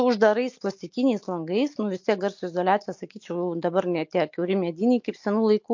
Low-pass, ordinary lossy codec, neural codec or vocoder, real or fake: 7.2 kHz; MP3, 48 kbps; none; real